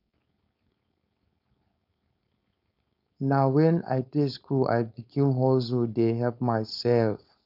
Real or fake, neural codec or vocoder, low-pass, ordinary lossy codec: fake; codec, 16 kHz, 4.8 kbps, FACodec; 5.4 kHz; none